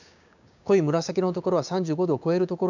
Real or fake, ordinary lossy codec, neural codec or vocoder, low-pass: fake; none; codec, 16 kHz, 6 kbps, DAC; 7.2 kHz